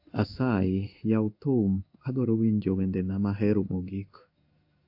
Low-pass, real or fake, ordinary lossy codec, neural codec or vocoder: 5.4 kHz; fake; AAC, 48 kbps; codec, 16 kHz in and 24 kHz out, 1 kbps, XY-Tokenizer